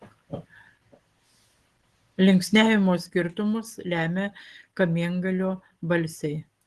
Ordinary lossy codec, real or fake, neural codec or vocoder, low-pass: Opus, 16 kbps; real; none; 10.8 kHz